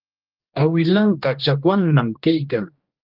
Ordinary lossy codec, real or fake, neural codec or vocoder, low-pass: Opus, 32 kbps; fake; codec, 16 kHz, 1 kbps, X-Codec, HuBERT features, trained on general audio; 5.4 kHz